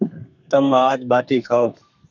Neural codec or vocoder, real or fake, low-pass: codec, 32 kHz, 1.9 kbps, SNAC; fake; 7.2 kHz